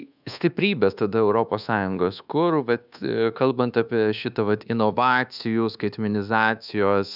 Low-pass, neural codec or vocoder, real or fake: 5.4 kHz; codec, 24 kHz, 1.2 kbps, DualCodec; fake